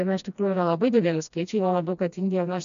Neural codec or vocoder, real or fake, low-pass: codec, 16 kHz, 1 kbps, FreqCodec, smaller model; fake; 7.2 kHz